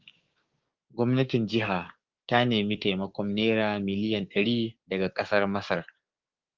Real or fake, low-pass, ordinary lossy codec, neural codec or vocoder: fake; 7.2 kHz; Opus, 32 kbps; codec, 16 kHz, 6 kbps, DAC